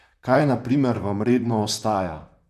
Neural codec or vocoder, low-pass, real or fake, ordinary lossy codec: vocoder, 44.1 kHz, 128 mel bands, Pupu-Vocoder; 14.4 kHz; fake; none